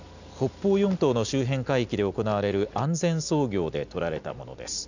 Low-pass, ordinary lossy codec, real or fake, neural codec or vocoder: 7.2 kHz; none; real; none